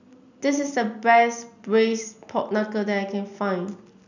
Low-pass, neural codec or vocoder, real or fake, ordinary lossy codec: 7.2 kHz; none; real; none